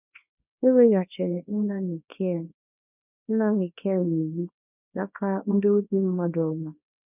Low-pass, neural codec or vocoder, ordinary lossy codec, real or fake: 3.6 kHz; codec, 24 kHz, 0.9 kbps, WavTokenizer, small release; none; fake